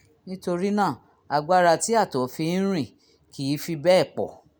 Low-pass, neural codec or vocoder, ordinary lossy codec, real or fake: none; none; none; real